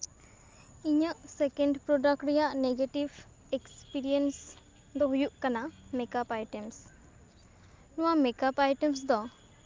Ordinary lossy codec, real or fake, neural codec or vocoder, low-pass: Opus, 32 kbps; real; none; 7.2 kHz